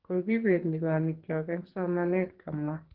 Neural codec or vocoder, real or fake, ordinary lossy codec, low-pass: codec, 44.1 kHz, 2.6 kbps, SNAC; fake; Opus, 16 kbps; 5.4 kHz